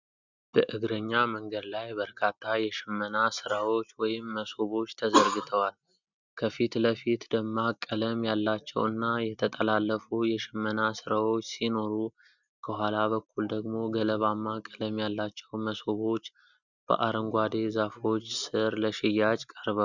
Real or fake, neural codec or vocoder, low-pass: real; none; 7.2 kHz